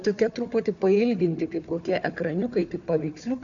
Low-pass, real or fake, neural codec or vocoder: 7.2 kHz; fake; codec, 16 kHz, 4 kbps, FunCodec, trained on Chinese and English, 50 frames a second